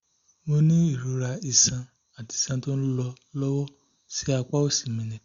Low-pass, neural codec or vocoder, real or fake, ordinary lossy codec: 7.2 kHz; none; real; Opus, 64 kbps